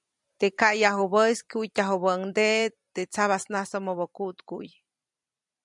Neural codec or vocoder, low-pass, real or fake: vocoder, 44.1 kHz, 128 mel bands every 256 samples, BigVGAN v2; 10.8 kHz; fake